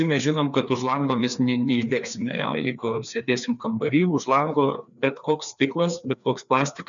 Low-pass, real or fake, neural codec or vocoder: 7.2 kHz; fake; codec, 16 kHz, 2 kbps, FreqCodec, larger model